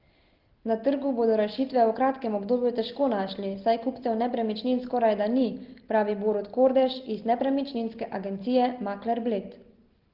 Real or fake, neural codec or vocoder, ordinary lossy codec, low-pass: real; none; Opus, 16 kbps; 5.4 kHz